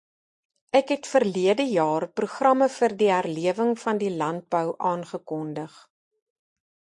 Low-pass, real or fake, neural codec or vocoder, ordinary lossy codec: 10.8 kHz; real; none; MP3, 48 kbps